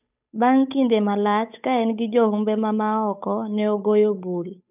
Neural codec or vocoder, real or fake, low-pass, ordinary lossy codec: codec, 16 kHz, 8 kbps, FunCodec, trained on Chinese and English, 25 frames a second; fake; 3.6 kHz; none